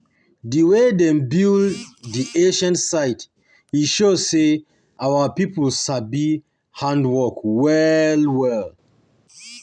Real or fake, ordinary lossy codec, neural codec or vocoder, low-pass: real; none; none; 9.9 kHz